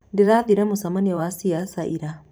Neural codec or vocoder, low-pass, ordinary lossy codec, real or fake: vocoder, 44.1 kHz, 128 mel bands every 512 samples, BigVGAN v2; none; none; fake